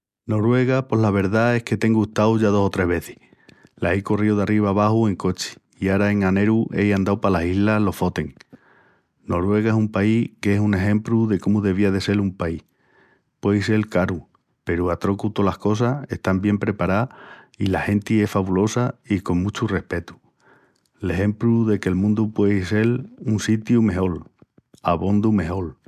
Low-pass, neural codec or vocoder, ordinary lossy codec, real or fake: 14.4 kHz; none; MP3, 96 kbps; real